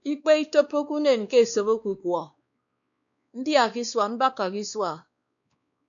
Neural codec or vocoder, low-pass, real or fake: codec, 16 kHz, 2 kbps, X-Codec, WavLM features, trained on Multilingual LibriSpeech; 7.2 kHz; fake